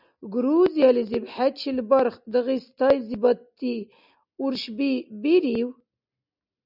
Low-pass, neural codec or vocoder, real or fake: 5.4 kHz; none; real